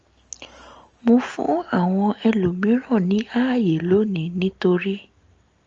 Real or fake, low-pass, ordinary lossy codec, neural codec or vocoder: real; 7.2 kHz; Opus, 24 kbps; none